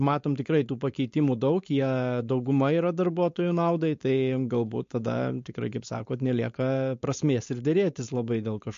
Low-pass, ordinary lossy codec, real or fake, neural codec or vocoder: 7.2 kHz; MP3, 48 kbps; fake; codec, 16 kHz, 4.8 kbps, FACodec